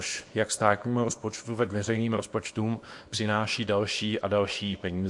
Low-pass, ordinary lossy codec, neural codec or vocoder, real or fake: 10.8 kHz; MP3, 48 kbps; codec, 24 kHz, 0.9 kbps, WavTokenizer, small release; fake